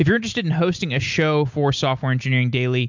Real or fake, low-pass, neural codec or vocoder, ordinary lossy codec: real; 7.2 kHz; none; MP3, 64 kbps